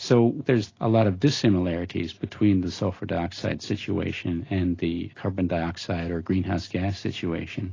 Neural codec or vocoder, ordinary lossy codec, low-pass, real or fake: none; AAC, 32 kbps; 7.2 kHz; real